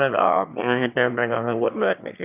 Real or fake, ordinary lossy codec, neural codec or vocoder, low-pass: fake; none; autoencoder, 22.05 kHz, a latent of 192 numbers a frame, VITS, trained on one speaker; 3.6 kHz